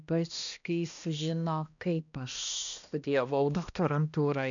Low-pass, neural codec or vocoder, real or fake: 7.2 kHz; codec, 16 kHz, 1 kbps, X-Codec, HuBERT features, trained on balanced general audio; fake